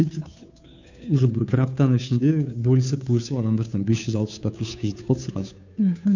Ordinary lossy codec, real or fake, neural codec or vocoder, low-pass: none; fake; codec, 16 kHz, 2 kbps, FunCodec, trained on Chinese and English, 25 frames a second; 7.2 kHz